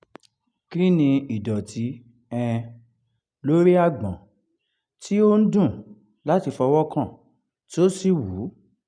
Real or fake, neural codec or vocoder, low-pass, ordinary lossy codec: real; none; 9.9 kHz; none